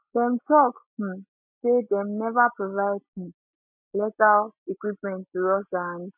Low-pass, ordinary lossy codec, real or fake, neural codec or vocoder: 3.6 kHz; none; real; none